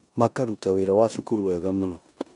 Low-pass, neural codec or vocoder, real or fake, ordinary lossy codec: 10.8 kHz; codec, 16 kHz in and 24 kHz out, 0.9 kbps, LongCat-Audio-Codec, four codebook decoder; fake; none